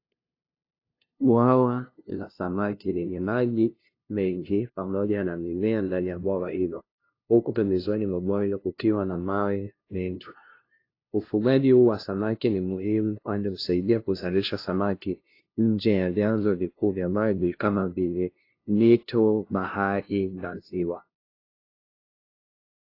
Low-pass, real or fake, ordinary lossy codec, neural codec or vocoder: 5.4 kHz; fake; AAC, 32 kbps; codec, 16 kHz, 0.5 kbps, FunCodec, trained on LibriTTS, 25 frames a second